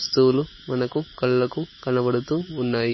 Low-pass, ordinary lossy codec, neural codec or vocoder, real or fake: 7.2 kHz; MP3, 24 kbps; autoencoder, 48 kHz, 128 numbers a frame, DAC-VAE, trained on Japanese speech; fake